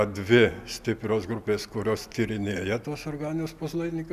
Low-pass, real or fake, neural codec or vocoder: 14.4 kHz; real; none